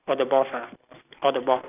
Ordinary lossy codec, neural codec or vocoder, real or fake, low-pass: none; none; real; 3.6 kHz